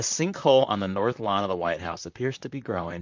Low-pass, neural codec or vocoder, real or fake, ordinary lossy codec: 7.2 kHz; vocoder, 44.1 kHz, 128 mel bands, Pupu-Vocoder; fake; MP3, 64 kbps